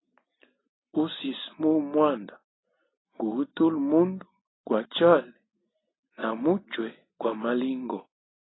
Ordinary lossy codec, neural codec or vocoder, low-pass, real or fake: AAC, 16 kbps; none; 7.2 kHz; real